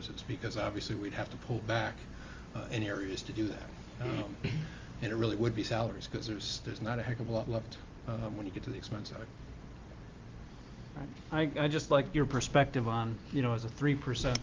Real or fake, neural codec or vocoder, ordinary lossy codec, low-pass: real; none; Opus, 32 kbps; 7.2 kHz